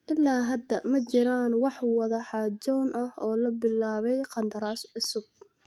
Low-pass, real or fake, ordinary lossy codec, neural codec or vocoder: 19.8 kHz; fake; MP3, 96 kbps; codec, 44.1 kHz, 7.8 kbps, DAC